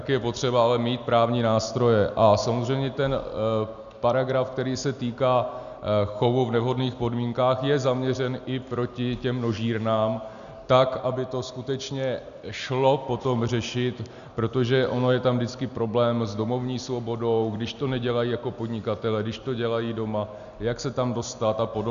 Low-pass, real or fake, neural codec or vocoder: 7.2 kHz; real; none